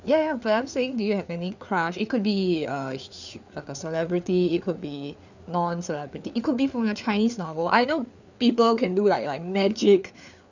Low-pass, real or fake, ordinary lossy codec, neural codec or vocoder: 7.2 kHz; fake; none; codec, 16 kHz, 4 kbps, FreqCodec, larger model